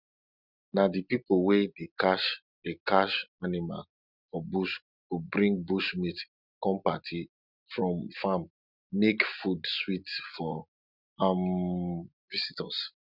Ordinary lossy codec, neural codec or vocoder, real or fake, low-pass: none; none; real; 5.4 kHz